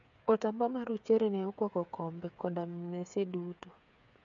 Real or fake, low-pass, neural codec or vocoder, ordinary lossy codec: fake; 7.2 kHz; codec, 16 kHz, 8 kbps, FreqCodec, smaller model; MP3, 64 kbps